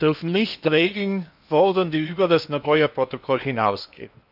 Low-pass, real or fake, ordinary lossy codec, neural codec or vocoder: 5.4 kHz; fake; none; codec, 16 kHz in and 24 kHz out, 0.8 kbps, FocalCodec, streaming, 65536 codes